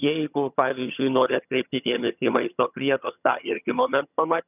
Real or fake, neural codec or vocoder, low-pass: fake; vocoder, 22.05 kHz, 80 mel bands, HiFi-GAN; 3.6 kHz